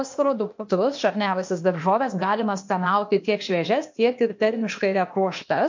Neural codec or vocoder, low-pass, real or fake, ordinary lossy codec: codec, 16 kHz, 0.8 kbps, ZipCodec; 7.2 kHz; fake; MP3, 48 kbps